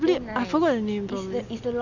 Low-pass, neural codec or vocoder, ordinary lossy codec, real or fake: 7.2 kHz; none; none; real